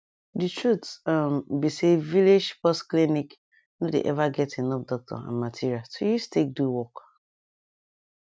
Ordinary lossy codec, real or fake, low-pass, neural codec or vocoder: none; real; none; none